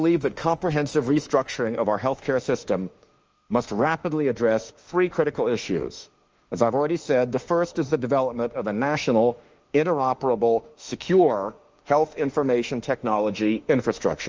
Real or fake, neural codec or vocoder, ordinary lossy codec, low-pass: fake; autoencoder, 48 kHz, 32 numbers a frame, DAC-VAE, trained on Japanese speech; Opus, 24 kbps; 7.2 kHz